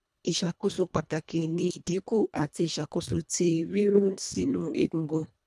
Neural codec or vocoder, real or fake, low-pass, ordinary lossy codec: codec, 24 kHz, 1.5 kbps, HILCodec; fake; none; none